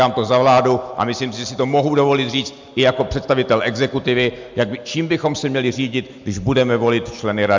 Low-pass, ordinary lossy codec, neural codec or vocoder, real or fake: 7.2 kHz; MP3, 64 kbps; none; real